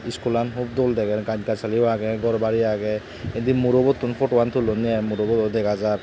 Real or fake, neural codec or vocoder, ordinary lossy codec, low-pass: real; none; none; none